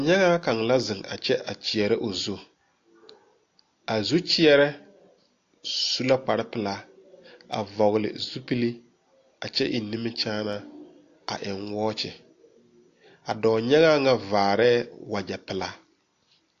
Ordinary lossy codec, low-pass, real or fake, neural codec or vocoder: AAC, 48 kbps; 7.2 kHz; real; none